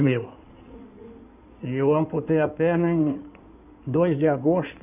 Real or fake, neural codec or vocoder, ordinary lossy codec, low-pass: fake; codec, 16 kHz in and 24 kHz out, 2.2 kbps, FireRedTTS-2 codec; none; 3.6 kHz